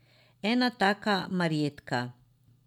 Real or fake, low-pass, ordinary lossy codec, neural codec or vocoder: real; 19.8 kHz; none; none